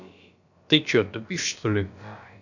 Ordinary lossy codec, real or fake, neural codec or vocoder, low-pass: none; fake; codec, 16 kHz, about 1 kbps, DyCAST, with the encoder's durations; 7.2 kHz